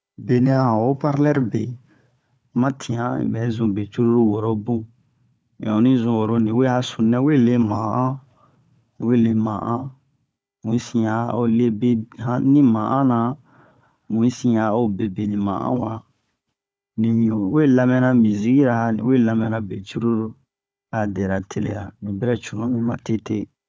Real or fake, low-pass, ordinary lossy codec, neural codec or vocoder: fake; none; none; codec, 16 kHz, 4 kbps, FunCodec, trained on Chinese and English, 50 frames a second